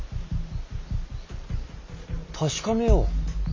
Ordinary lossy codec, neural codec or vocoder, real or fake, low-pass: MP3, 32 kbps; none; real; 7.2 kHz